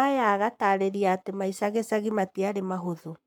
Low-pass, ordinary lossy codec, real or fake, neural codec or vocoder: 19.8 kHz; MP3, 96 kbps; fake; codec, 44.1 kHz, 7.8 kbps, Pupu-Codec